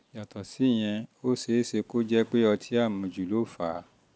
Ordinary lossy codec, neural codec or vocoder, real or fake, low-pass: none; none; real; none